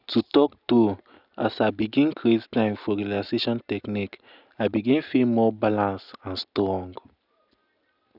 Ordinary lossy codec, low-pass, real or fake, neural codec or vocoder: none; 5.4 kHz; real; none